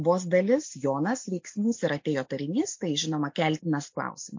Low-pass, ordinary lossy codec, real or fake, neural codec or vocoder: 7.2 kHz; MP3, 48 kbps; real; none